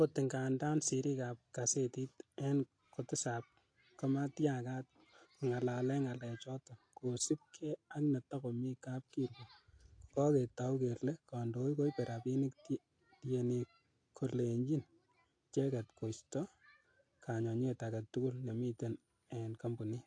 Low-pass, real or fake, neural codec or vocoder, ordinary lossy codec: 9.9 kHz; real; none; AAC, 48 kbps